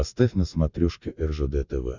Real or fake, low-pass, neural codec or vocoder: real; 7.2 kHz; none